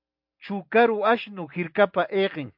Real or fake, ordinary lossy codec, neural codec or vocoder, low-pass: real; MP3, 48 kbps; none; 5.4 kHz